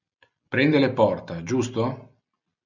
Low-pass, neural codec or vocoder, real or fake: 7.2 kHz; none; real